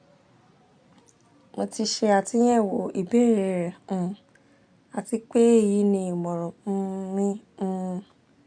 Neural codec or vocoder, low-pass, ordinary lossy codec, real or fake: none; 9.9 kHz; MP3, 64 kbps; real